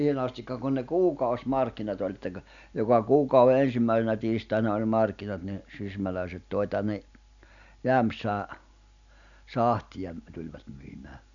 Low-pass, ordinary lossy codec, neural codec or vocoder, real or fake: 7.2 kHz; none; none; real